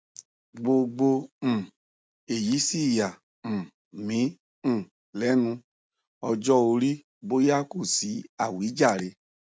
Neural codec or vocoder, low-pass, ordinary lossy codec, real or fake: none; none; none; real